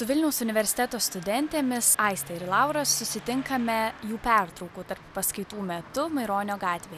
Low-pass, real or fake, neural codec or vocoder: 14.4 kHz; real; none